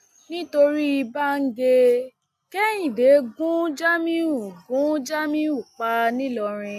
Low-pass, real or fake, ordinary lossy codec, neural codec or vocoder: 14.4 kHz; real; none; none